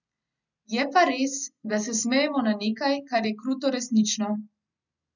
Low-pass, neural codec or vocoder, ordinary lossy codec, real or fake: 7.2 kHz; none; none; real